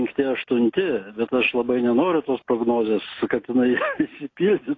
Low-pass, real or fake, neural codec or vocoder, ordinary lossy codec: 7.2 kHz; real; none; AAC, 32 kbps